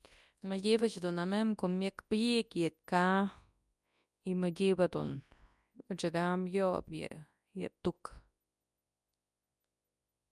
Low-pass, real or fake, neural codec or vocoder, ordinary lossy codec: none; fake; codec, 24 kHz, 0.9 kbps, WavTokenizer, large speech release; none